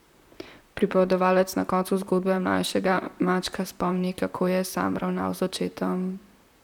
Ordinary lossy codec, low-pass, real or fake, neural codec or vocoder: none; 19.8 kHz; fake; vocoder, 44.1 kHz, 128 mel bands, Pupu-Vocoder